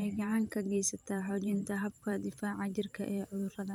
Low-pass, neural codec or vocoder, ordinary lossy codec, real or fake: 19.8 kHz; vocoder, 44.1 kHz, 128 mel bands every 512 samples, BigVGAN v2; none; fake